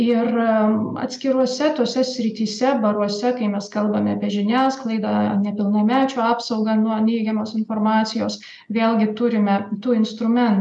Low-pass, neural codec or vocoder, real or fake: 10.8 kHz; none; real